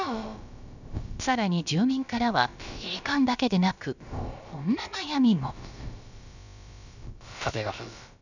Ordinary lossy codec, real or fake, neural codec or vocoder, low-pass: none; fake; codec, 16 kHz, about 1 kbps, DyCAST, with the encoder's durations; 7.2 kHz